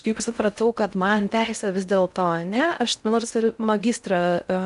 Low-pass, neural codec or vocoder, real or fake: 10.8 kHz; codec, 16 kHz in and 24 kHz out, 0.6 kbps, FocalCodec, streaming, 4096 codes; fake